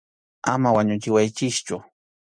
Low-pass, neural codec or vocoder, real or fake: 9.9 kHz; none; real